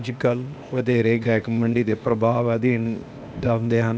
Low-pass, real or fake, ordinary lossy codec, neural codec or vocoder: none; fake; none; codec, 16 kHz, 0.8 kbps, ZipCodec